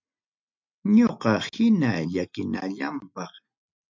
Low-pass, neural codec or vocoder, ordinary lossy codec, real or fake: 7.2 kHz; none; MP3, 64 kbps; real